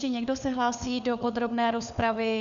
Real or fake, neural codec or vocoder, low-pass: fake; codec, 16 kHz, 4 kbps, FunCodec, trained on LibriTTS, 50 frames a second; 7.2 kHz